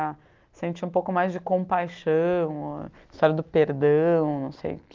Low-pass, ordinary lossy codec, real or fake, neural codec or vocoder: 7.2 kHz; Opus, 32 kbps; real; none